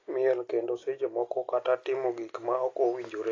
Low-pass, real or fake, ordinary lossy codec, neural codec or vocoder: 7.2 kHz; real; AAC, 32 kbps; none